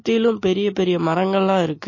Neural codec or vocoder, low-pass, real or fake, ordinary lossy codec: none; 7.2 kHz; real; MP3, 32 kbps